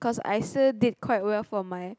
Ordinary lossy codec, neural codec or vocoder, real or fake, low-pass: none; none; real; none